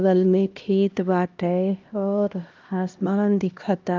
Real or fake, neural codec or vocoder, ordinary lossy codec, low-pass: fake; codec, 16 kHz, 1 kbps, X-Codec, HuBERT features, trained on LibriSpeech; Opus, 24 kbps; 7.2 kHz